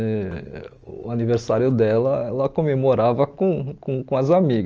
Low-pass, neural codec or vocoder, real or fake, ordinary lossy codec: 7.2 kHz; none; real; Opus, 24 kbps